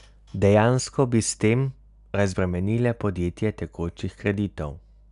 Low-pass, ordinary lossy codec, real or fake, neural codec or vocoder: 10.8 kHz; none; real; none